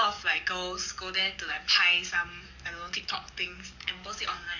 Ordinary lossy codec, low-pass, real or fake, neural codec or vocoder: none; 7.2 kHz; fake; codec, 44.1 kHz, 7.8 kbps, DAC